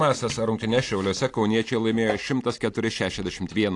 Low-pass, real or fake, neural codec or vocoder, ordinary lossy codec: 10.8 kHz; real; none; AAC, 48 kbps